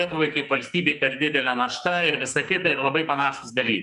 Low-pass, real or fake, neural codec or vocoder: 10.8 kHz; fake; codec, 44.1 kHz, 2.6 kbps, SNAC